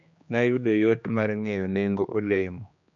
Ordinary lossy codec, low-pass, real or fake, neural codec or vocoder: MP3, 64 kbps; 7.2 kHz; fake; codec, 16 kHz, 2 kbps, X-Codec, HuBERT features, trained on general audio